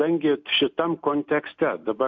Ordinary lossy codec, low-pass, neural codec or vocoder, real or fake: MP3, 48 kbps; 7.2 kHz; none; real